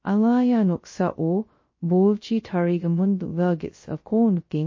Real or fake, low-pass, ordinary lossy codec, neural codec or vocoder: fake; 7.2 kHz; MP3, 32 kbps; codec, 16 kHz, 0.2 kbps, FocalCodec